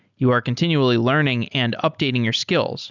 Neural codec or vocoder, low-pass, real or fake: none; 7.2 kHz; real